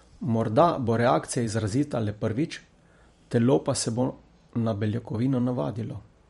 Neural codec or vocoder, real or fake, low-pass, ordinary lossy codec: vocoder, 44.1 kHz, 128 mel bands every 512 samples, BigVGAN v2; fake; 19.8 kHz; MP3, 48 kbps